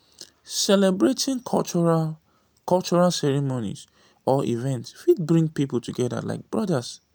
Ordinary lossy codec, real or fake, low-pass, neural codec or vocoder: none; real; none; none